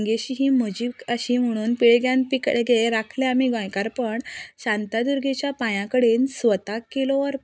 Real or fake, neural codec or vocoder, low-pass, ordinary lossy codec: real; none; none; none